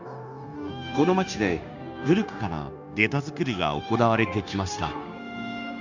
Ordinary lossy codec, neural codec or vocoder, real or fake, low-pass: none; codec, 16 kHz, 0.9 kbps, LongCat-Audio-Codec; fake; 7.2 kHz